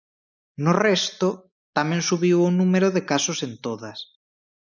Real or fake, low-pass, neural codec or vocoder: real; 7.2 kHz; none